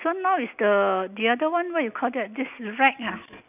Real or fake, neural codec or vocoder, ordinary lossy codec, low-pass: real; none; none; 3.6 kHz